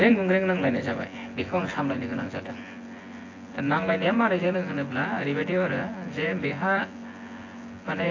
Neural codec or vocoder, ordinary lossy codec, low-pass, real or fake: vocoder, 24 kHz, 100 mel bands, Vocos; AAC, 32 kbps; 7.2 kHz; fake